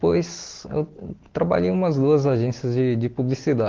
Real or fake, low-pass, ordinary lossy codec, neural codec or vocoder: real; 7.2 kHz; Opus, 16 kbps; none